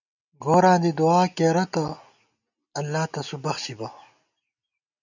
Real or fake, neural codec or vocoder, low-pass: real; none; 7.2 kHz